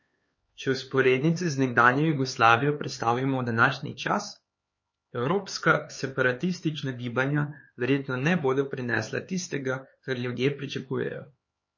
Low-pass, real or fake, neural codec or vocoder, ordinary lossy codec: 7.2 kHz; fake; codec, 16 kHz, 4 kbps, X-Codec, HuBERT features, trained on LibriSpeech; MP3, 32 kbps